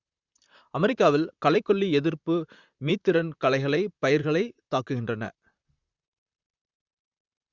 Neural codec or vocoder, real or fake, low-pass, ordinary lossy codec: vocoder, 44.1 kHz, 128 mel bands, Pupu-Vocoder; fake; 7.2 kHz; Opus, 64 kbps